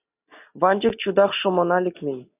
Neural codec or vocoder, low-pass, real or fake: none; 3.6 kHz; real